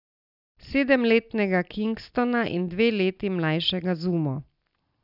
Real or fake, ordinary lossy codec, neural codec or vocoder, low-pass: real; none; none; 5.4 kHz